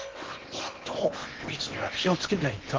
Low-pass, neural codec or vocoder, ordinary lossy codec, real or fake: 7.2 kHz; codec, 16 kHz in and 24 kHz out, 0.8 kbps, FocalCodec, streaming, 65536 codes; Opus, 16 kbps; fake